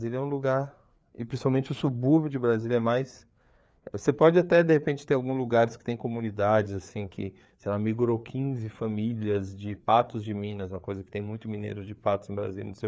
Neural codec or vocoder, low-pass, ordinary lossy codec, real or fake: codec, 16 kHz, 4 kbps, FreqCodec, larger model; none; none; fake